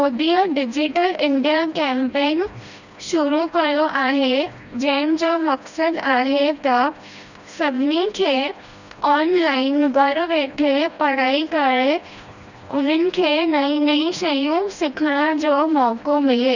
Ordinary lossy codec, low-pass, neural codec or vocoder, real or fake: none; 7.2 kHz; codec, 16 kHz, 1 kbps, FreqCodec, smaller model; fake